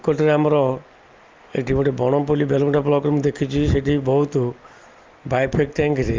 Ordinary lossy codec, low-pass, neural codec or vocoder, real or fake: Opus, 32 kbps; 7.2 kHz; none; real